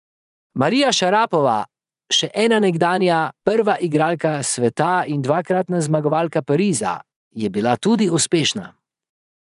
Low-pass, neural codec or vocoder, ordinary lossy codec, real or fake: 10.8 kHz; vocoder, 24 kHz, 100 mel bands, Vocos; none; fake